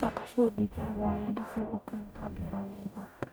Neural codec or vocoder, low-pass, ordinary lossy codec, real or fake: codec, 44.1 kHz, 0.9 kbps, DAC; none; none; fake